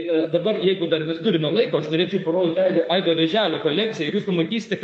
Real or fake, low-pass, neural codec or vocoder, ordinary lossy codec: fake; 10.8 kHz; autoencoder, 48 kHz, 32 numbers a frame, DAC-VAE, trained on Japanese speech; MP3, 48 kbps